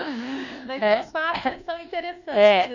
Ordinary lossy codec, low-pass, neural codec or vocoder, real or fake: none; 7.2 kHz; codec, 24 kHz, 1.2 kbps, DualCodec; fake